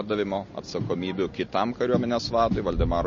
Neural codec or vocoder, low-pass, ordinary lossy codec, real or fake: none; 7.2 kHz; MP3, 32 kbps; real